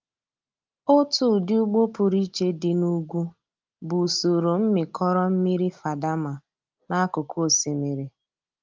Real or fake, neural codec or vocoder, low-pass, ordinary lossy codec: real; none; 7.2 kHz; Opus, 24 kbps